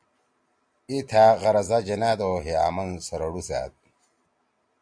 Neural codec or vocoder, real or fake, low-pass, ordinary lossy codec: none; real; 9.9 kHz; AAC, 64 kbps